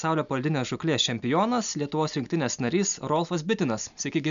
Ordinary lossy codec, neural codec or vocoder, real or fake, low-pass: MP3, 96 kbps; none; real; 7.2 kHz